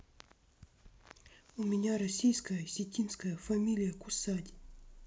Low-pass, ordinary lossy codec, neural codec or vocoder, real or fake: none; none; none; real